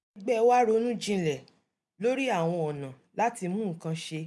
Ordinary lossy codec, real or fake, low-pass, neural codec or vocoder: none; real; none; none